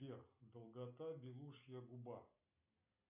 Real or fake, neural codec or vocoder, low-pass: real; none; 3.6 kHz